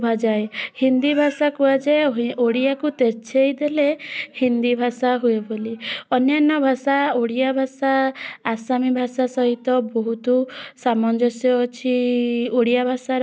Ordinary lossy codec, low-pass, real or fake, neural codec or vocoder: none; none; real; none